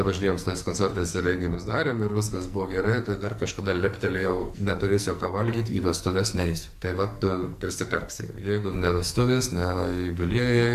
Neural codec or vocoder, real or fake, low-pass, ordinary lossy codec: codec, 44.1 kHz, 2.6 kbps, SNAC; fake; 14.4 kHz; AAC, 96 kbps